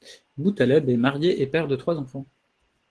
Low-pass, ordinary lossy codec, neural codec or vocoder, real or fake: 10.8 kHz; Opus, 16 kbps; none; real